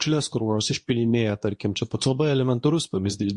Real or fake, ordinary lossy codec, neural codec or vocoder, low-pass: fake; MP3, 48 kbps; codec, 24 kHz, 0.9 kbps, WavTokenizer, medium speech release version 2; 10.8 kHz